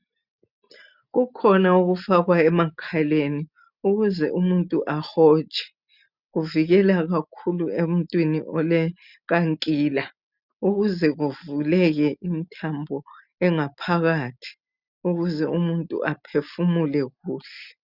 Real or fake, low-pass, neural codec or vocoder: real; 5.4 kHz; none